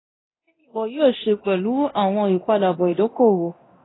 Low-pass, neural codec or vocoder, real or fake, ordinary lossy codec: 7.2 kHz; codec, 24 kHz, 0.9 kbps, DualCodec; fake; AAC, 16 kbps